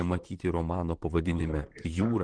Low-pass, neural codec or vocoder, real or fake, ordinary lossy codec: 9.9 kHz; vocoder, 44.1 kHz, 128 mel bands, Pupu-Vocoder; fake; Opus, 16 kbps